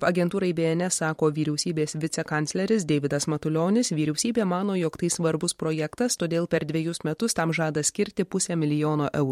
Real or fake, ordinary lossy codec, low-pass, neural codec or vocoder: fake; MP3, 64 kbps; 19.8 kHz; vocoder, 44.1 kHz, 128 mel bands every 512 samples, BigVGAN v2